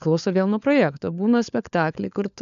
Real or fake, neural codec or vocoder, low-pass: fake; codec, 16 kHz, 4 kbps, FunCodec, trained on LibriTTS, 50 frames a second; 7.2 kHz